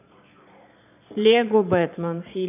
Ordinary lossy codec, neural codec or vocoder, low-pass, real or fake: none; vocoder, 22.05 kHz, 80 mel bands, Vocos; 3.6 kHz; fake